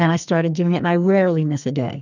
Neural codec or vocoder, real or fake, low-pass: codec, 44.1 kHz, 2.6 kbps, SNAC; fake; 7.2 kHz